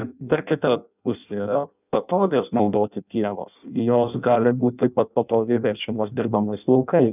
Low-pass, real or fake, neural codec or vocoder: 3.6 kHz; fake; codec, 16 kHz in and 24 kHz out, 0.6 kbps, FireRedTTS-2 codec